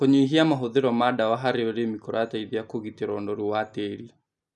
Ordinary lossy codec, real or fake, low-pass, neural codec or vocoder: none; real; 10.8 kHz; none